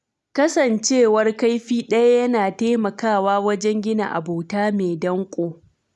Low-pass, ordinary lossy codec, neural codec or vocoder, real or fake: none; none; none; real